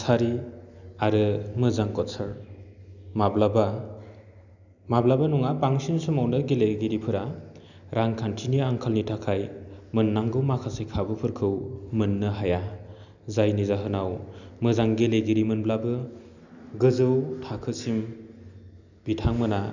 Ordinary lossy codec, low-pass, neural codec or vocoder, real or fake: none; 7.2 kHz; none; real